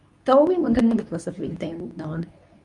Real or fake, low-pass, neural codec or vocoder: fake; 10.8 kHz; codec, 24 kHz, 0.9 kbps, WavTokenizer, medium speech release version 2